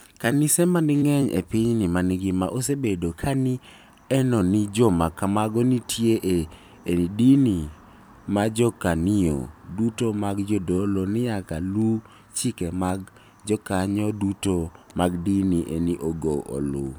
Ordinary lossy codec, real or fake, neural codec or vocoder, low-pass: none; fake; vocoder, 44.1 kHz, 128 mel bands every 256 samples, BigVGAN v2; none